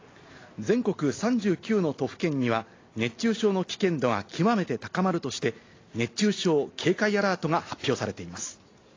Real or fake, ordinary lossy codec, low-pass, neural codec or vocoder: real; AAC, 32 kbps; 7.2 kHz; none